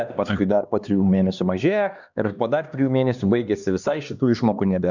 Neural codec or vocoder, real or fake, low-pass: codec, 16 kHz, 2 kbps, X-Codec, HuBERT features, trained on LibriSpeech; fake; 7.2 kHz